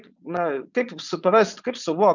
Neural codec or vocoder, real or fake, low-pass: vocoder, 22.05 kHz, 80 mel bands, Vocos; fake; 7.2 kHz